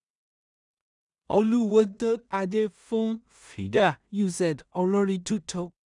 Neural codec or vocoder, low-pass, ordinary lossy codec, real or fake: codec, 16 kHz in and 24 kHz out, 0.4 kbps, LongCat-Audio-Codec, two codebook decoder; 10.8 kHz; none; fake